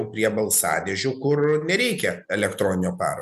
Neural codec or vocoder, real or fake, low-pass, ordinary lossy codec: none; real; 14.4 kHz; MP3, 96 kbps